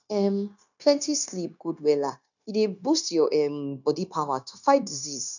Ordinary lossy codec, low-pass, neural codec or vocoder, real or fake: none; 7.2 kHz; codec, 16 kHz, 0.9 kbps, LongCat-Audio-Codec; fake